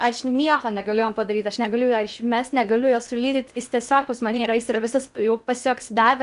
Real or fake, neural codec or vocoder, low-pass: fake; codec, 16 kHz in and 24 kHz out, 0.8 kbps, FocalCodec, streaming, 65536 codes; 10.8 kHz